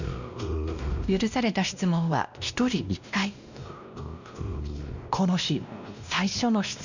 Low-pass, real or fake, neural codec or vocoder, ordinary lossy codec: 7.2 kHz; fake; codec, 16 kHz, 1 kbps, X-Codec, WavLM features, trained on Multilingual LibriSpeech; none